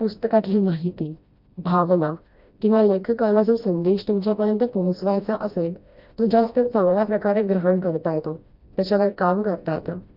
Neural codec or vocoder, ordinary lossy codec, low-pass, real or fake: codec, 16 kHz, 1 kbps, FreqCodec, smaller model; Opus, 64 kbps; 5.4 kHz; fake